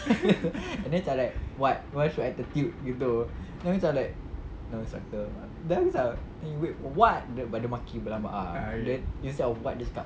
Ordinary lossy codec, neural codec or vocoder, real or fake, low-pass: none; none; real; none